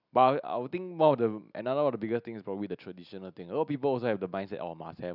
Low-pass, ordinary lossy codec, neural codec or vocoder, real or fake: 5.4 kHz; none; none; real